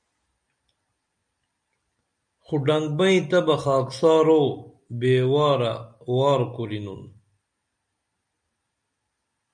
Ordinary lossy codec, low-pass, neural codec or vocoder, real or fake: MP3, 96 kbps; 9.9 kHz; none; real